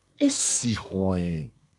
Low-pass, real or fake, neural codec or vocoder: 10.8 kHz; fake; codec, 24 kHz, 1 kbps, SNAC